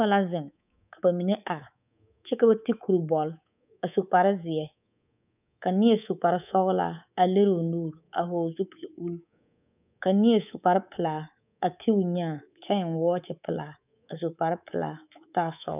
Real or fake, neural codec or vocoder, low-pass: fake; codec, 24 kHz, 3.1 kbps, DualCodec; 3.6 kHz